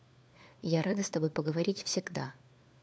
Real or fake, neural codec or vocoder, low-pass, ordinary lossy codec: fake; codec, 16 kHz, 4 kbps, FunCodec, trained on LibriTTS, 50 frames a second; none; none